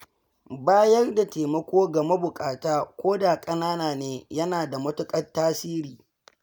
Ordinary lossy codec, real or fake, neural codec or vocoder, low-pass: none; real; none; none